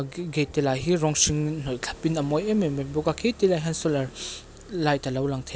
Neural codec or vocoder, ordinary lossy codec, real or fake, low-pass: none; none; real; none